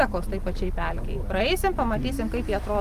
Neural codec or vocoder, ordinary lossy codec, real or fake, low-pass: none; Opus, 32 kbps; real; 14.4 kHz